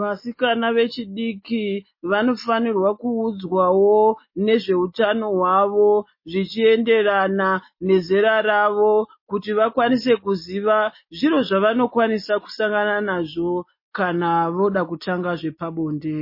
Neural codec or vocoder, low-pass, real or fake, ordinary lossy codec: none; 5.4 kHz; real; MP3, 24 kbps